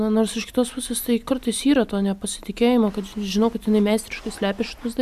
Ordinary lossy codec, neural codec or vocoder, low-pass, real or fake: MP3, 96 kbps; none; 14.4 kHz; real